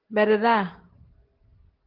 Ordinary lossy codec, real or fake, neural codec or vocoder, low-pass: Opus, 16 kbps; real; none; 5.4 kHz